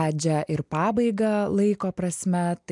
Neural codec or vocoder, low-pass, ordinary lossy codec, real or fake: none; 10.8 kHz; MP3, 96 kbps; real